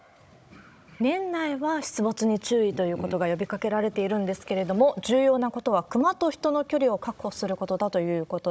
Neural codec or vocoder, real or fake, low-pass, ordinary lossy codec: codec, 16 kHz, 16 kbps, FunCodec, trained on Chinese and English, 50 frames a second; fake; none; none